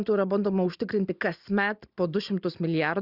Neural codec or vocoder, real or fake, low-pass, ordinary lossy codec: none; real; 5.4 kHz; Opus, 64 kbps